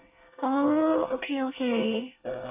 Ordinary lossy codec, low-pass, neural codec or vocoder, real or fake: none; 3.6 kHz; codec, 24 kHz, 1 kbps, SNAC; fake